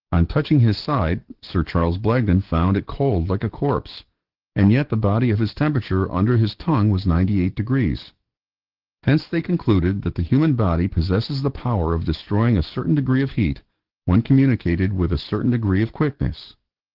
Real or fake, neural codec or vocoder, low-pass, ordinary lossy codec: fake; codec, 44.1 kHz, 7.8 kbps, DAC; 5.4 kHz; Opus, 16 kbps